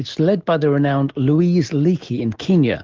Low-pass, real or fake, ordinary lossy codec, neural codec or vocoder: 7.2 kHz; real; Opus, 16 kbps; none